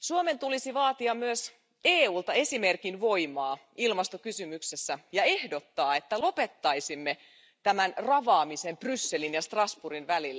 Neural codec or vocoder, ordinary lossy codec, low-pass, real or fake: none; none; none; real